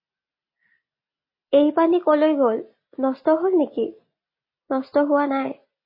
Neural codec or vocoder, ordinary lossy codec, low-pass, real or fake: none; MP3, 24 kbps; 5.4 kHz; real